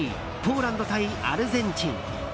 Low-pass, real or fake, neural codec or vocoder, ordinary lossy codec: none; real; none; none